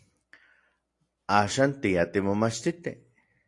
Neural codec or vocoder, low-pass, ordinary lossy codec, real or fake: none; 10.8 kHz; AAC, 48 kbps; real